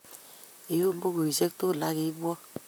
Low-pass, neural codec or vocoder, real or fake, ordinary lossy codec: none; vocoder, 44.1 kHz, 128 mel bands, Pupu-Vocoder; fake; none